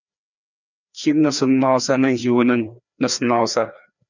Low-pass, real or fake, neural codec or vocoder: 7.2 kHz; fake; codec, 16 kHz, 2 kbps, FreqCodec, larger model